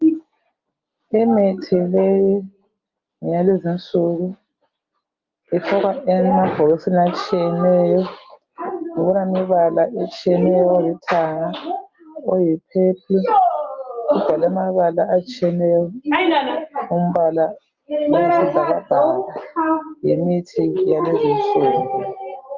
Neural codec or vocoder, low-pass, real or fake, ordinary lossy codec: none; 7.2 kHz; real; Opus, 24 kbps